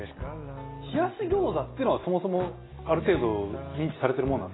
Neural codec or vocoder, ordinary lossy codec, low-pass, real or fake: none; AAC, 16 kbps; 7.2 kHz; real